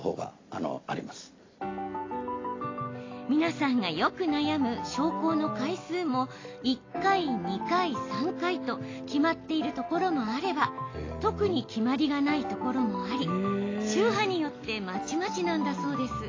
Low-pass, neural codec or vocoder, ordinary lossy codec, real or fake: 7.2 kHz; none; AAC, 32 kbps; real